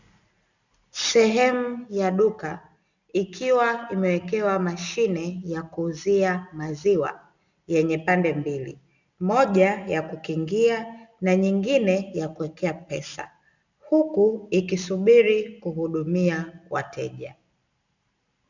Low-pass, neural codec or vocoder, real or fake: 7.2 kHz; none; real